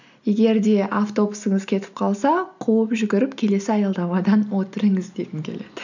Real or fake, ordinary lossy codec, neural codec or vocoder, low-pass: real; none; none; 7.2 kHz